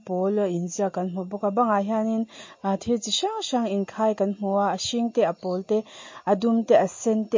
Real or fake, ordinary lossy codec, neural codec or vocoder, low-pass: real; MP3, 32 kbps; none; 7.2 kHz